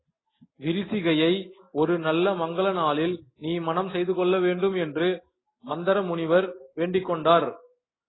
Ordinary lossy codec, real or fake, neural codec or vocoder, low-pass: AAC, 16 kbps; real; none; 7.2 kHz